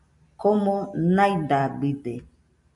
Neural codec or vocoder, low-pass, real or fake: none; 10.8 kHz; real